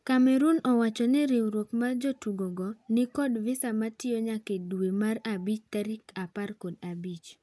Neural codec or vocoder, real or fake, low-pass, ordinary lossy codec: none; real; none; none